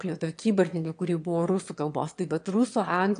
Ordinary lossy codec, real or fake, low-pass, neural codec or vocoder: AAC, 64 kbps; fake; 9.9 kHz; autoencoder, 22.05 kHz, a latent of 192 numbers a frame, VITS, trained on one speaker